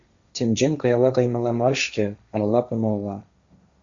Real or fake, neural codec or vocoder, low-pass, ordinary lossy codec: fake; codec, 16 kHz, 1.1 kbps, Voila-Tokenizer; 7.2 kHz; Opus, 64 kbps